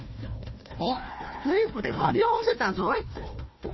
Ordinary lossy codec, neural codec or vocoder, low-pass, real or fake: MP3, 24 kbps; codec, 16 kHz, 1 kbps, FunCodec, trained on Chinese and English, 50 frames a second; 7.2 kHz; fake